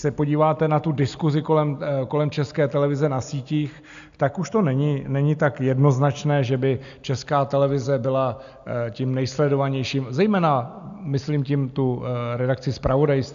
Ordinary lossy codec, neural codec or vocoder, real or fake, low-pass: AAC, 96 kbps; none; real; 7.2 kHz